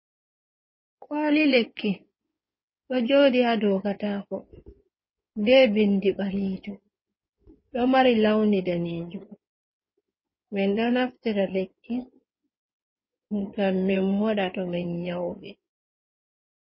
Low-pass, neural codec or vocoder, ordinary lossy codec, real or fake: 7.2 kHz; codec, 16 kHz in and 24 kHz out, 2.2 kbps, FireRedTTS-2 codec; MP3, 24 kbps; fake